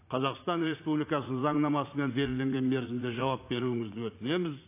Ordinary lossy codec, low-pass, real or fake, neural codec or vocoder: AAC, 24 kbps; 3.6 kHz; fake; vocoder, 44.1 kHz, 80 mel bands, Vocos